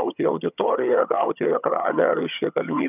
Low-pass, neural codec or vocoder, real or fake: 3.6 kHz; vocoder, 22.05 kHz, 80 mel bands, HiFi-GAN; fake